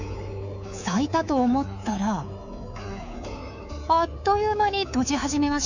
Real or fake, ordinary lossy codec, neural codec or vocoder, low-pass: fake; none; codec, 24 kHz, 3.1 kbps, DualCodec; 7.2 kHz